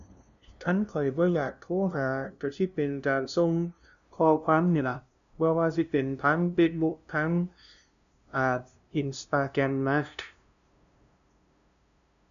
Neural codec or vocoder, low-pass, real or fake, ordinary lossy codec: codec, 16 kHz, 0.5 kbps, FunCodec, trained on LibriTTS, 25 frames a second; 7.2 kHz; fake; none